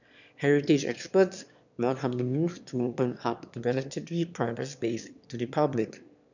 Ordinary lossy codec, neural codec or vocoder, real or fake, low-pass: none; autoencoder, 22.05 kHz, a latent of 192 numbers a frame, VITS, trained on one speaker; fake; 7.2 kHz